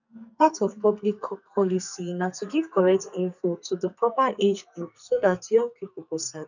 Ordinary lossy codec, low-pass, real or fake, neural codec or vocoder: none; 7.2 kHz; fake; codec, 44.1 kHz, 2.6 kbps, SNAC